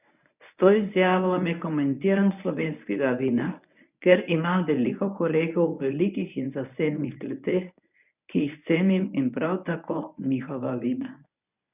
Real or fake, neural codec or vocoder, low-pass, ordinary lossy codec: fake; codec, 24 kHz, 0.9 kbps, WavTokenizer, medium speech release version 1; 3.6 kHz; none